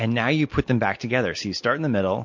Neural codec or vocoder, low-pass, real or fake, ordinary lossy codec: none; 7.2 kHz; real; MP3, 48 kbps